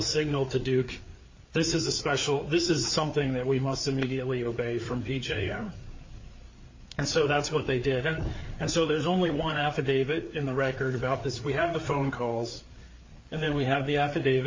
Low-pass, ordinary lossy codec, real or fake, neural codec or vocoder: 7.2 kHz; MP3, 32 kbps; fake; codec, 16 kHz, 4 kbps, FreqCodec, larger model